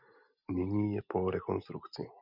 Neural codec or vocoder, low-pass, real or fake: none; 5.4 kHz; real